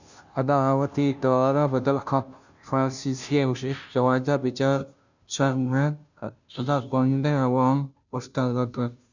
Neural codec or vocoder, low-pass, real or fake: codec, 16 kHz, 0.5 kbps, FunCodec, trained on Chinese and English, 25 frames a second; 7.2 kHz; fake